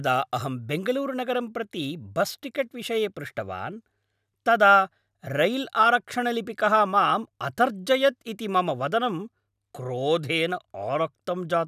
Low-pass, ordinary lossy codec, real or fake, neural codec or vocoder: 14.4 kHz; none; real; none